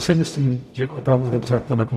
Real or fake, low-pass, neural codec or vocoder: fake; 14.4 kHz; codec, 44.1 kHz, 0.9 kbps, DAC